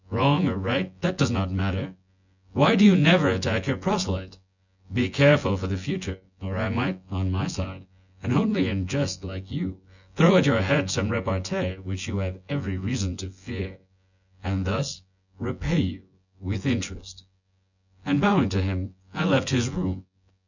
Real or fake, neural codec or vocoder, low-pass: fake; vocoder, 24 kHz, 100 mel bands, Vocos; 7.2 kHz